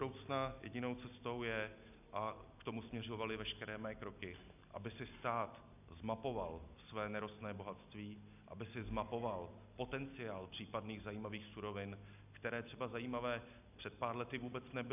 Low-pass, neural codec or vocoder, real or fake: 3.6 kHz; none; real